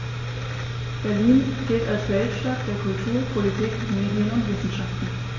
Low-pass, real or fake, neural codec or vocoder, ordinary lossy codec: 7.2 kHz; real; none; MP3, 32 kbps